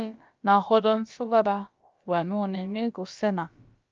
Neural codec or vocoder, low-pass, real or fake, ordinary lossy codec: codec, 16 kHz, about 1 kbps, DyCAST, with the encoder's durations; 7.2 kHz; fake; Opus, 24 kbps